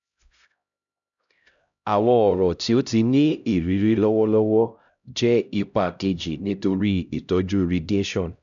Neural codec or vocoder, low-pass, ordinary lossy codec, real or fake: codec, 16 kHz, 0.5 kbps, X-Codec, HuBERT features, trained on LibriSpeech; 7.2 kHz; none; fake